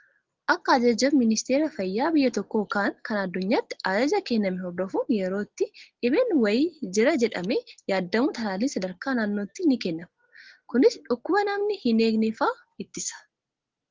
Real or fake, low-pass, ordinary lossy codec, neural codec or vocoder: real; 7.2 kHz; Opus, 16 kbps; none